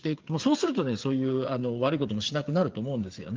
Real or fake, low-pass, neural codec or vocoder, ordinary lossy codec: fake; 7.2 kHz; codec, 16 kHz, 8 kbps, FreqCodec, smaller model; Opus, 32 kbps